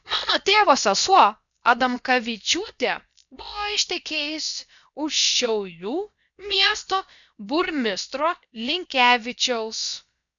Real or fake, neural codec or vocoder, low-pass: fake; codec, 16 kHz, about 1 kbps, DyCAST, with the encoder's durations; 7.2 kHz